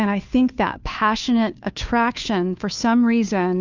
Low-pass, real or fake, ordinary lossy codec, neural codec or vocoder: 7.2 kHz; fake; Opus, 64 kbps; codec, 16 kHz, 2 kbps, FunCodec, trained on Chinese and English, 25 frames a second